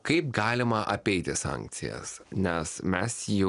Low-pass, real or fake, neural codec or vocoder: 10.8 kHz; real; none